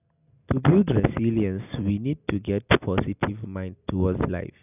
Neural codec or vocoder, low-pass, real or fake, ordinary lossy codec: none; 3.6 kHz; real; none